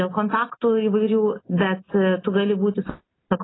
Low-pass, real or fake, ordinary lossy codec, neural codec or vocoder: 7.2 kHz; real; AAC, 16 kbps; none